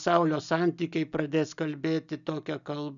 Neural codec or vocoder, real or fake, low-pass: none; real; 7.2 kHz